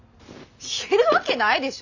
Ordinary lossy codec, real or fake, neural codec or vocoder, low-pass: none; real; none; 7.2 kHz